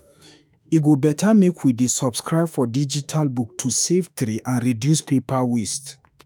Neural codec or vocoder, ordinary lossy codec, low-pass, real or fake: autoencoder, 48 kHz, 32 numbers a frame, DAC-VAE, trained on Japanese speech; none; none; fake